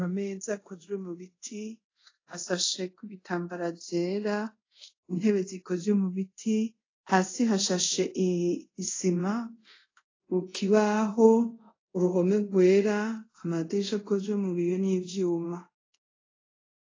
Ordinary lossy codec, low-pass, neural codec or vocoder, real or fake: AAC, 32 kbps; 7.2 kHz; codec, 24 kHz, 0.5 kbps, DualCodec; fake